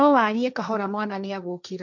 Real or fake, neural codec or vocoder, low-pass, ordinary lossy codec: fake; codec, 16 kHz, 1.1 kbps, Voila-Tokenizer; 7.2 kHz; none